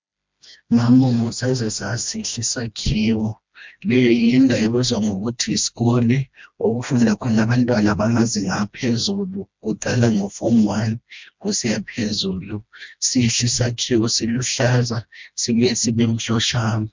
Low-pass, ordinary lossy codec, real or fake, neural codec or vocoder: 7.2 kHz; MP3, 64 kbps; fake; codec, 16 kHz, 1 kbps, FreqCodec, smaller model